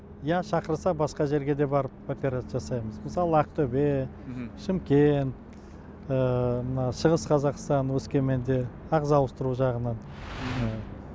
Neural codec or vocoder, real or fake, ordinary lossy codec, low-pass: none; real; none; none